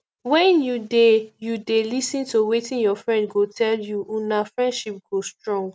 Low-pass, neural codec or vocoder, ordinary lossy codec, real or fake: none; none; none; real